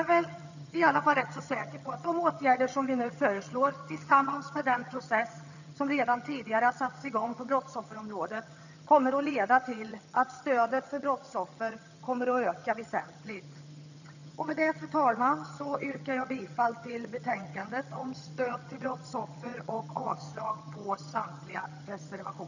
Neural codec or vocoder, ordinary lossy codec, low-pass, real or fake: vocoder, 22.05 kHz, 80 mel bands, HiFi-GAN; none; 7.2 kHz; fake